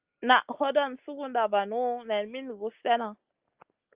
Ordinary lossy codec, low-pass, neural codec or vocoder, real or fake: Opus, 32 kbps; 3.6 kHz; none; real